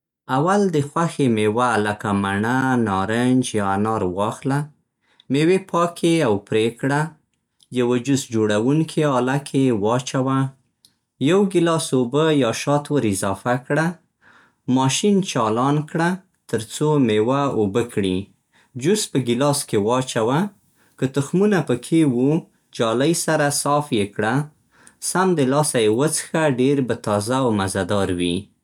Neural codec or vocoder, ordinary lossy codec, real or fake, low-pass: none; none; real; 19.8 kHz